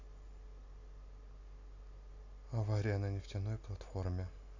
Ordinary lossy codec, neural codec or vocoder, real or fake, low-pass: none; none; real; 7.2 kHz